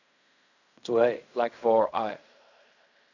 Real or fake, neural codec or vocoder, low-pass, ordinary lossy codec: fake; codec, 16 kHz in and 24 kHz out, 0.4 kbps, LongCat-Audio-Codec, fine tuned four codebook decoder; 7.2 kHz; none